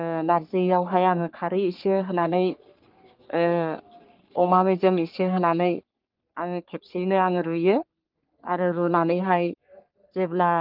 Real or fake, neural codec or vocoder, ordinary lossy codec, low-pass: fake; codec, 44.1 kHz, 3.4 kbps, Pupu-Codec; Opus, 24 kbps; 5.4 kHz